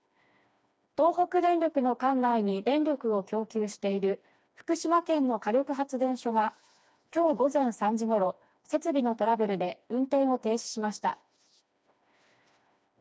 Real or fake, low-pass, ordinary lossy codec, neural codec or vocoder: fake; none; none; codec, 16 kHz, 2 kbps, FreqCodec, smaller model